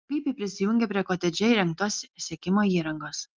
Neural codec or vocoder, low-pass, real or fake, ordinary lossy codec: none; 7.2 kHz; real; Opus, 24 kbps